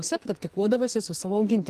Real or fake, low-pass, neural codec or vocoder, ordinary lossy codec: fake; 14.4 kHz; codec, 44.1 kHz, 2.6 kbps, SNAC; Opus, 24 kbps